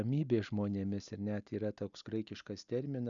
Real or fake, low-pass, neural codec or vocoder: real; 7.2 kHz; none